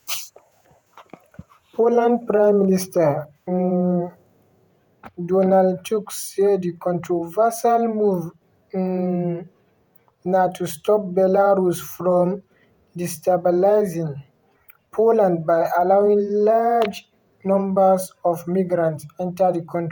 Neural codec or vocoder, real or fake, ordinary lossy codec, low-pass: vocoder, 48 kHz, 128 mel bands, Vocos; fake; none; none